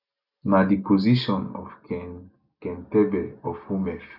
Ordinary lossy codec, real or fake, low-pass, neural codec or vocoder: none; real; 5.4 kHz; none